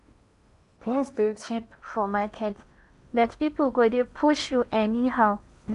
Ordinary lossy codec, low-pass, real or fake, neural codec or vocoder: none; 10.8 kHz; fake; codec, 16 kHz in and 24 kHz out, 0.8 kbps, FocalCodec, streaming, 65536 codes